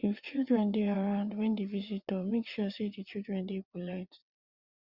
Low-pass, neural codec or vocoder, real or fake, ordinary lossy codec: 5.4 kHz; vocoder, 22.05 kHz, 80 mel bands, WaveNeXt; fake; Opus, 64 kbps